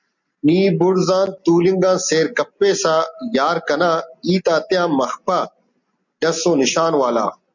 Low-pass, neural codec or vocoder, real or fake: 7.2 kHz; none; real